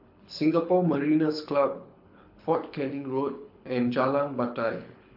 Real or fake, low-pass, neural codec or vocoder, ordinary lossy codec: fake; 5.4 kHz; codec, 24 kHz, 6 kbps, HILCodec; MP3, 48 kbps